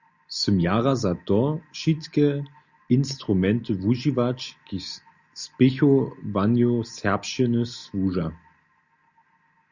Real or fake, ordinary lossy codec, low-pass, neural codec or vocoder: real; Opus, 64 kbps; 7.2 kHz; none